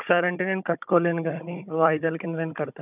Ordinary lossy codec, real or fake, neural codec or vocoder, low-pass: none; fake; codec, 16 kHz, 16 kbps, FunCodec, trained on Chinese and English, 50 frames a second; 3.6 kHz